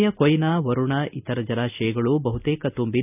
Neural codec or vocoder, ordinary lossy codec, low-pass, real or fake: none; none; 3.6 kHz; real